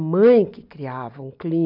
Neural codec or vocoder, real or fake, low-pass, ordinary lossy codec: none; real; 5.4 kHz; none